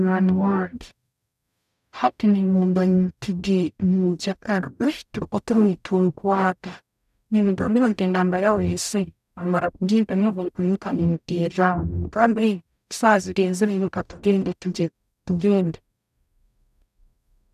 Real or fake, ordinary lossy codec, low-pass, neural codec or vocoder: fake; none; 14.4 kHz; codec, 44.1 kHz, 0.9 kbps, DAC